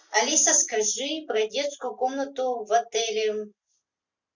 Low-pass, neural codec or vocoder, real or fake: 7.2 kHz; none; real